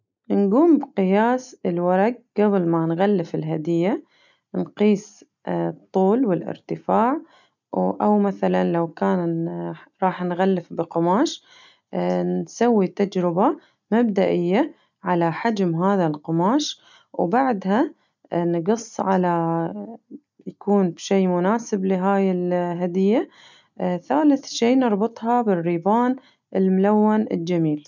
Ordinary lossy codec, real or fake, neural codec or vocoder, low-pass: none; real; none; 7.2 kHz